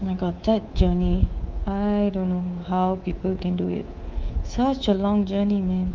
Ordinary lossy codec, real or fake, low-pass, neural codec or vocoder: Opus, 24 kbps; fake; 7.2 kHz; codec, 24 kHz, 3.1 kbps, DualCodec